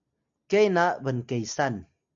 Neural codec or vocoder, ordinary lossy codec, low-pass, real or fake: none; MP3, 64 kbps; 7.2 kHz; real